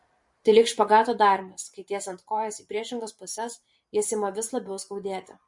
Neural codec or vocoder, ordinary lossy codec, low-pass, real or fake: vocoder, 44.1 kHz, 128 mel bands every 512 samples, BigVGAN v2; MP3, 48 kbps; 10.8 kHz; fake